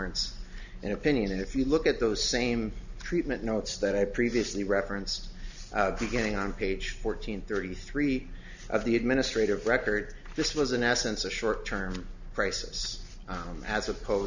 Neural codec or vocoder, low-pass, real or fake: none; 7.2 kHz; real